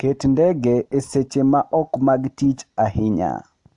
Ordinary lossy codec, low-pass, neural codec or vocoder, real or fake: AAC, 64 kbps; 10.8 kHz; none; real